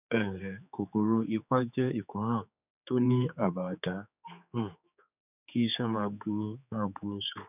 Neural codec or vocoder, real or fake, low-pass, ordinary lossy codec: codec, 16 kHz, 4 kbps, X-Codec, HuBERT features, trained on general audio; fake; 3.6 kHz; none